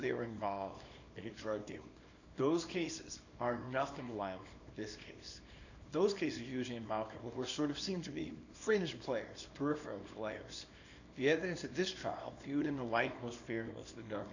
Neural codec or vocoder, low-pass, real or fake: codec, 24 kHz, 0.9 kbps, WavTokenizer, small release; 7.2 kHz; fake